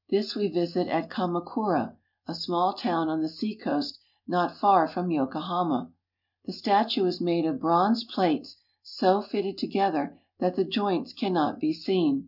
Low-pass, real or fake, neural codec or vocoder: 5.4 kHz; real; none